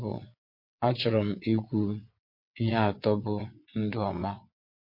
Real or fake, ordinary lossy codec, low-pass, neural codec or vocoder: fake; MP3, 32 kbps; 5.4 kHz; vocoder, 22.05 kHz, 80 mel bands, WaveNeXt